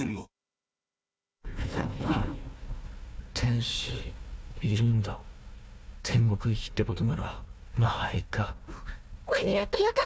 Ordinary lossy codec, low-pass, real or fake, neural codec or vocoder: none; none; fake; codec, 16 kHz, 1 kbps, FunCodec, trained on Chinese and English, 50 frames a second